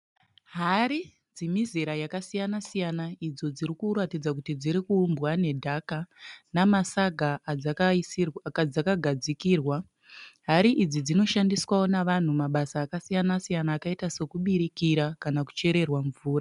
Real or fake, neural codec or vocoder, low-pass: real; none; 10.8 kHz